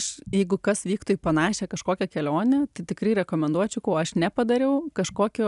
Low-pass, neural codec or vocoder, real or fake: 10.8 kHz; none; real